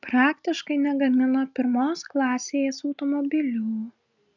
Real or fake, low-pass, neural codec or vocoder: real; 7.2 kHz; none